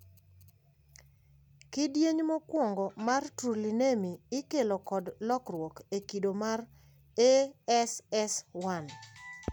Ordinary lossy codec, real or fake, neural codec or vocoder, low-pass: none; real; none; none